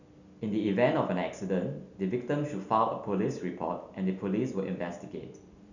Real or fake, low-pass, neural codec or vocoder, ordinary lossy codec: real; 7.2 kHz; none; none